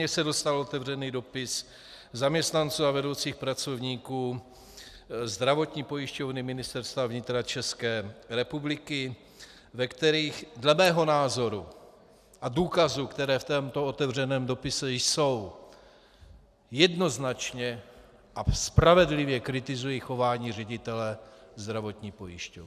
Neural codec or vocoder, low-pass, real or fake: none; 14.4 kHz; real